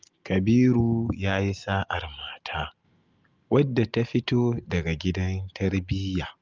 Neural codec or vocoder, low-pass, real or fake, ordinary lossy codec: none; 7.2 kHz; real; Opus, 32 kbps